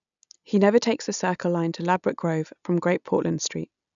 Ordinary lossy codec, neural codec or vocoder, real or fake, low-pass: none; none; real; 7.2 kHz